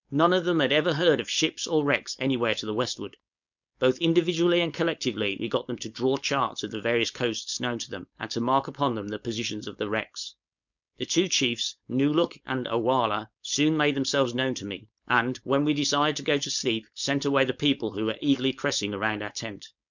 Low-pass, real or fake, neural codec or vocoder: 7.2 kHz; fake; codec, 16 kHz, 4.8 kbps, FACodec